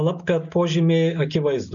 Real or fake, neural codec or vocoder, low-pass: real; none; 7.2 kHz